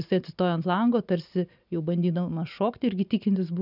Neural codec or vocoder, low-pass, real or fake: none; 5.4 kHz; real